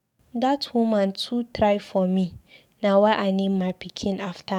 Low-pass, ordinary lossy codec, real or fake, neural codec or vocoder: 19.8 kHz; none; fake; codec, 44.1 kHz, 7.8 kbps, DAC